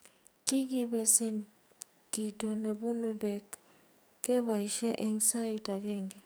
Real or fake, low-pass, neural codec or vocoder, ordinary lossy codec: fake; none; codec, 44.1 kHz, 2.6 kbps, SNAC; none